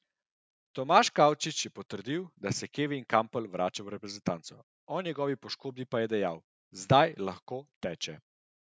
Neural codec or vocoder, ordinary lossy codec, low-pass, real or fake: none; none; none; real